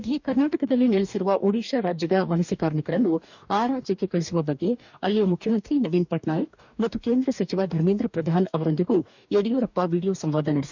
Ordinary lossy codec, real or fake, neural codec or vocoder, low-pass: none; fake; codec, 44.1 kHz, 2.6 kbps, DAC; 7.2 kHz